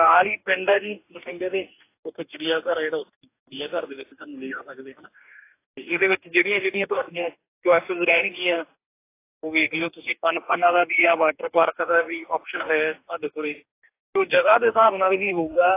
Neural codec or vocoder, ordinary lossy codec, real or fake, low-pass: codec, 44.1 kHz, 2.6 kbps, DAC; AAC, 24 kbps; fake; 3.6 kHz